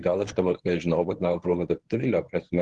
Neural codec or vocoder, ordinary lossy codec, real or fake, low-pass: codec, 24 kHz, 0.9 kbps, WavTokenizer, medium speech release version 1; Opus, 16 kbps; fake; 10.8 kHz